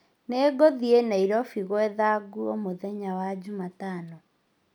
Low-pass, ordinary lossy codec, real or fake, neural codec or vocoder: 19.8 kHz; none; real; none